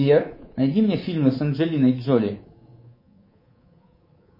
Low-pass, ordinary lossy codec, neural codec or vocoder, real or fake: 5.4 kHz; MP3, 24 kbps; codec, 24 kHz, 3.1 kbps, DualCodec; fake